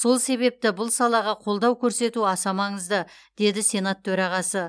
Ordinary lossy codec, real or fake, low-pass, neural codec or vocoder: none; real; none; none